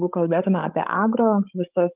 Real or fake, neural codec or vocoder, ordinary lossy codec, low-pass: fake; codec, 16 kHz, 16 kbps, FreqCodec, larger model; Opus, 32 kbps; 3.6 kHz